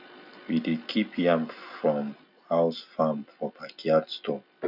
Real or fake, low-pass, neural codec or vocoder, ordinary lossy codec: real; 5.4 kHz; none; none